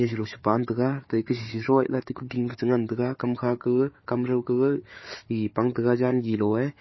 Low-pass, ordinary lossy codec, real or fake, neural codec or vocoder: 7.2 kHz; MP3, 24 kbps; fake; codec, 16 kHz, 4 kbps, FunCodec, trained on Chinese and English, 50 frames a second